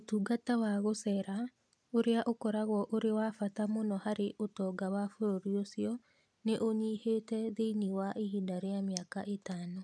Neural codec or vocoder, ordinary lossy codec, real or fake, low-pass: none; none; real; none